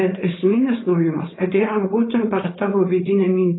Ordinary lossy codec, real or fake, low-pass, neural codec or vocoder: AAC, 16 kbps; fake; 7.2 kHz; codec, 16 kHz, 4.8 kbps, FACodec